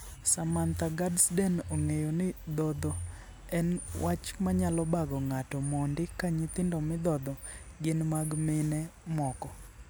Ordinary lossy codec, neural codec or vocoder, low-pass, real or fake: none; none; none; real